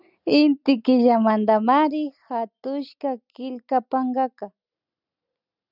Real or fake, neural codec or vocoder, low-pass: real; none; 5.4 kHz